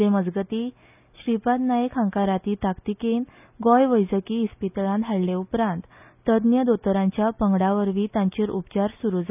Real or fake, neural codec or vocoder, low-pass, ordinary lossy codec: real; none; 3.6 kHz; none